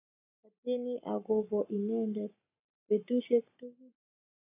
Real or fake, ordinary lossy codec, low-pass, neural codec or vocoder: fake; AAC, 32 kbps; 3.6 kHz; codec, 44.1 kHz, 7.8 kbps, Pupu-Codec